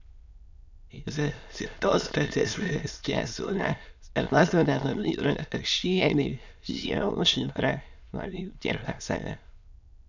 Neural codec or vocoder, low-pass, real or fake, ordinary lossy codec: autoencoder, 22.05 kHz, a latent of 192 numbers a frame, VITS, trained on many speakers; 7.2 kHz; fake; none